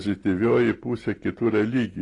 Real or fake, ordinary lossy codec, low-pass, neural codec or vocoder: real; AAC, 32 kbps; 10.8 kHz; none